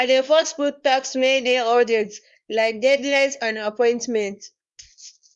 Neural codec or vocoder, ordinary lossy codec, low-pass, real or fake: codec, 24 kHz, 0.9 kbps, WavTokenizer, small release; none; 10.8 kHz; fake